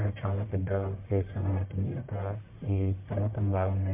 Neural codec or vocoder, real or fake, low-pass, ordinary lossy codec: codec, 44.1 kHz, 1.7 kbps, Pupu-Codec; fake; 3.6 kHz; MP3, 32 kbps